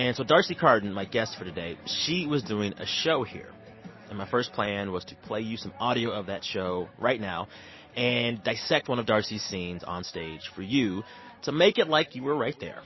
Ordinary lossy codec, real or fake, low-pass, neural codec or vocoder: MP3, 24 kbps; real; 7.2 kHz; none